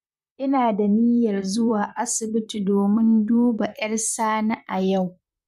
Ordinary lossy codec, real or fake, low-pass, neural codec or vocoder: none; fake; 14.4 kHz; vocoder, 44.1 kHz, 128 mel bands, Pupu-Vocoder